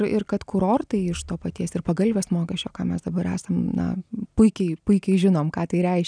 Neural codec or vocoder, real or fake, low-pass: none; real; 9.9 kHz